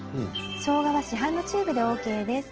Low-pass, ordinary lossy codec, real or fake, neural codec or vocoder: 7.2 kHz; Opus, 16 kbps; real; none